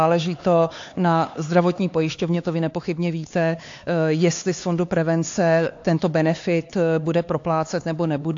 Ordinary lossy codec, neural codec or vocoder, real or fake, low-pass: AAC, 48 kbps; codec, 16 kHz, 4 kbps, X-Codec, HuBERT features, trained on LibriSpeech; fake; 7.2 kHz